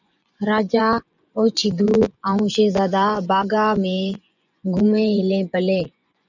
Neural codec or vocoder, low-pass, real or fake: vocoder, 24 kHz, 100 mel bands, Vocos; 7.2 kHz; fake